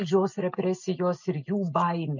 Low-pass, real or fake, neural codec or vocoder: 7.2 kHz; real; none